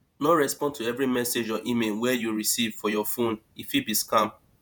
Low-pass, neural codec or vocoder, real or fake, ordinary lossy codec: none; vocoder, 48 kHz, 128 mel bands, Vocos; fake; none